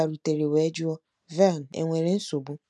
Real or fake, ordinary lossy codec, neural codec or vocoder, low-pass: real; none; none; none